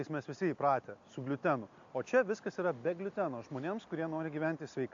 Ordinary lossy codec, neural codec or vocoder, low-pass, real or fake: AAC, 64 kbps; none; 7.2 kHz; real